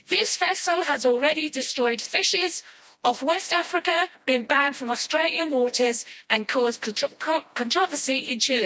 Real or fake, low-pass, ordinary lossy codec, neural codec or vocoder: fake; none; none; codec, 16 kHz, 1 kbps, FreqCodec, smaller model